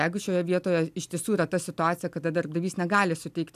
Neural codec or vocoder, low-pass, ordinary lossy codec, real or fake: none; 14.4 kHz; AAC, 96 kbps; real